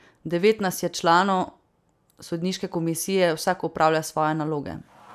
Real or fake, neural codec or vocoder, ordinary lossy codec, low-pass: real; none; none; 14.4 kHz